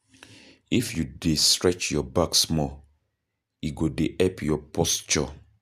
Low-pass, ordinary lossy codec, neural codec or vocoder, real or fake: 14.4 kHz; none; none; real